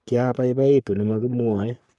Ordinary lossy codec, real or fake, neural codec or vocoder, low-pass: none; fake; codec, 44.1 kHz, 3.4 kbps, Pupu-Codec; 10.8 kHz